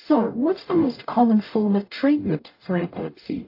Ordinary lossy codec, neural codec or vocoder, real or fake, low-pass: AAC, 32 kbps; codec, 44.1 kHz, 0.9 kbps, DAC; fake; 5.4 kHz